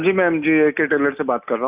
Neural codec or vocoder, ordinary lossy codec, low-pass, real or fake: none; none; 3.6 kHz; real